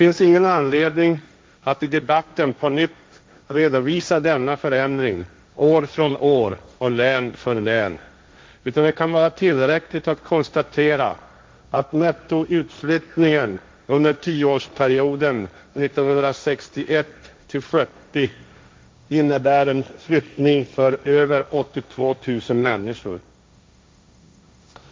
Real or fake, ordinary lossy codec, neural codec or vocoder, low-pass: fake; none; codec, 16 kHz, 1.1 kbps, Voila-Tokenizer; none